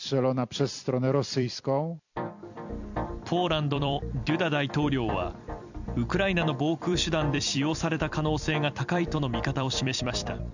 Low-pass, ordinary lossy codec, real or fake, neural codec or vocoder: 7.2 kHz; none; fake; vocoder, 44.1 kHz, 128 mel bands every 256 samples, BigVGAN v2